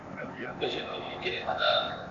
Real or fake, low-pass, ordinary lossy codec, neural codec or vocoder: fake; 7.2 kHz; AAC, 48 kbps; codec, 16 kHz, 0.8 kbps, ZipCodec